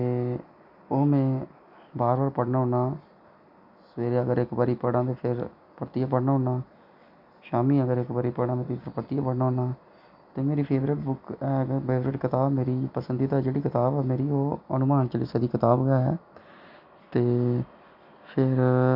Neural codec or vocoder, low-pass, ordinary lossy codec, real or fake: none; 5.4 kHz; none; real